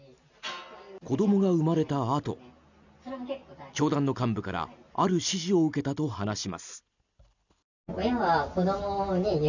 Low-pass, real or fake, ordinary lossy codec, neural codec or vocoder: 7.2 kHz; real; none; none